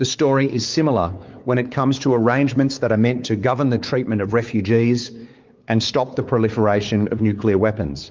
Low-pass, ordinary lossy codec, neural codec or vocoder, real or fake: 7.2 kHz; Opus, 32 kbps; codec, 16 kHz, 4 kbps, X-Codec, WavLM features, trained on Multilingual LibriSpeech; fake